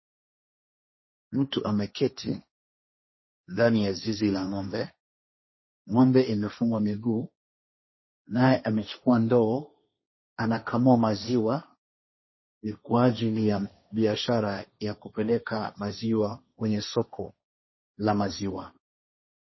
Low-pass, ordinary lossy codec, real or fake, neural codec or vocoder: 7.2 kHz; MP3, 24 kbps; fake; codec, 16 kHz, 1.1 kbps, Voila-Tokenizer